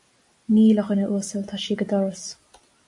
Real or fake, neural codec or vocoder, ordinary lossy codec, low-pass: real; none; AAC, 64 kbps; 10.8 kHz